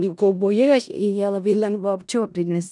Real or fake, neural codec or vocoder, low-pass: fake; codec, 16 kHz in and 24 kHz out, 0.4 kbps, LongCat-Audio-Codec, four codebook decoder; 10.8 kHz